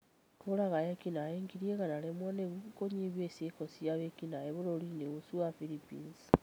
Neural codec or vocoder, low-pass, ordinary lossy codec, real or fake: none; none; none; real